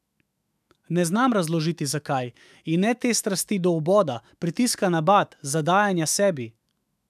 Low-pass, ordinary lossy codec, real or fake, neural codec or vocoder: 14.4 kHz; none; fake; autoencoder, 48 kHz, 128 numbers a frame, DAC-VAE, trained on Japanese speech